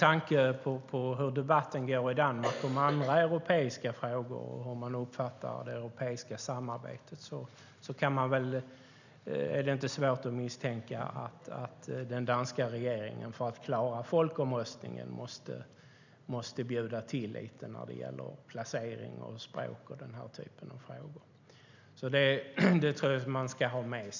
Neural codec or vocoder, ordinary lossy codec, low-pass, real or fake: none; none; 7.2 kHz; real